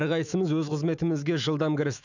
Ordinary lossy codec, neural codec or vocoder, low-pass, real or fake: none; autoencoder, 48 kHz, 128 numbers a frame, DAC-VAE, trained on Japanese speech; 7.2 kHz; fake